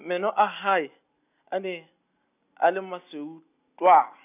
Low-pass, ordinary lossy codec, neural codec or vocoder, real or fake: 3.6 kHz; none; none; real